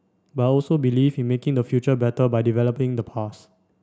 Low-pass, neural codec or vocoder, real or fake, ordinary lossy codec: none; none; real; none